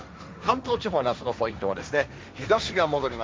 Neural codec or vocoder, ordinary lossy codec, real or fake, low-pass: codec, 16 kHz, 1.1 kbps, Voila-Tokenizer; none; fake; none